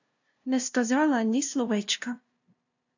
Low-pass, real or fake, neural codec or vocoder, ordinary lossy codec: 7.2 kHz; fake; codec, 16 kHz, 0.5 kbps, FunCodec, trained on LibriTTS, 25 frames a second; AAC, 48 kbps